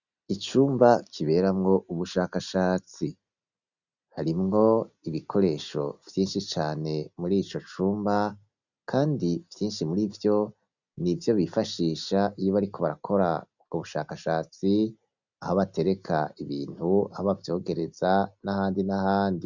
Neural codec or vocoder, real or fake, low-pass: none; real; 7.2 kHz